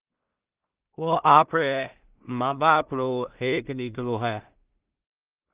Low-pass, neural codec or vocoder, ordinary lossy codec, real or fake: 3.6 kHz; codec, 16 kHz in and 24 kHz out, 0.4 kbps, LongCat-Audio-Codec, two codebook decoder; Opus, 32 kbps; fake